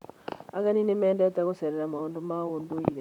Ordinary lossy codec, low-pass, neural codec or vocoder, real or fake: none; 19.8 kHz; vocoder, 44.1 kHz, 128 mel bands, Pupu-Vocoder; fake